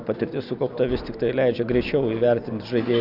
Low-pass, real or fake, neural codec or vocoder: 5.4 kHz; real; none